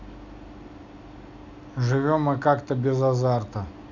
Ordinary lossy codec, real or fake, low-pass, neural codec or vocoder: none; real; 7.2 kHz; none